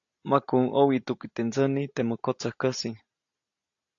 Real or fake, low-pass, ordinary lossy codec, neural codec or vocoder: real; 7.2 kHz; AAC, 48 kbps; none